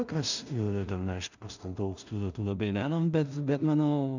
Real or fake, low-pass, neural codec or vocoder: fake; 7.2 kHz; codec, 16 kHz in and 24 kHz out, 0.4 kbps, LongCat-Audio-Codec, two codebook decoder